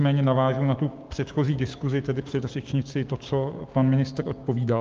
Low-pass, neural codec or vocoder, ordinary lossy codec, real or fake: 7.2 kHz; codec, 16 kHz, 6 kbps, DAC; Opus, 32 kbps; fake